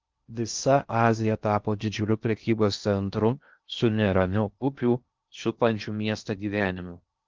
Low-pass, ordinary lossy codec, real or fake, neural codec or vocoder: 7.2 kHz; Opus, 32 kbps; fake; codec, 16 kHz in and 24 kHz out, 0.6 kbps, FocalCodec, streaming, 2048 codes